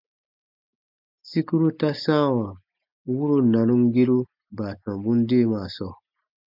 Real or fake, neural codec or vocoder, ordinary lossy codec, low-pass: real; none; MP3, 48 kbps; 5.4 kHz